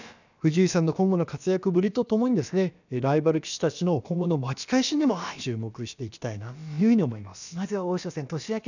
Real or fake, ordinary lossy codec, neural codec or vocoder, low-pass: fake; none; codec, 16 kHz, about 1 kbps, DyCAST, with the encoder's durations; 7.2 kHz